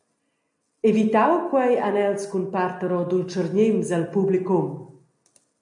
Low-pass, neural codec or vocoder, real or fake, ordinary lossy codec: 10.8 kHz; none; real; MP3, 48 kbps